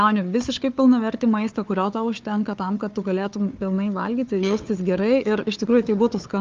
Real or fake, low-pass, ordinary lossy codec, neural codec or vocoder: fake; 7.2 kHz; Opus, 24 kbps; codec, 16 kHz, 4 kbps, FunCodec, trained on Chinese and English, 50 frames a second